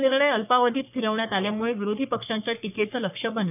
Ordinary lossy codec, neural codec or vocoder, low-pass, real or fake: none; codec, 44.1 kHz, 3.4 kbps, Pupu-Codec; 3.6 kHz; fake